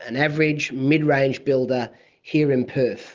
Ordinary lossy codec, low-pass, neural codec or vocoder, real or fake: Opus, 24 kbps; 7.2 kHz; none; real